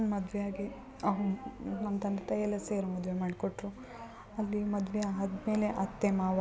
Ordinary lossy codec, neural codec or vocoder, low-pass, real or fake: none; none; none; real